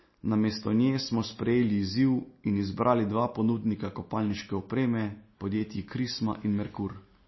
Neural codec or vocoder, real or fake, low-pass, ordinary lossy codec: none; real; 7.2 kHz; MP3, 24 kbps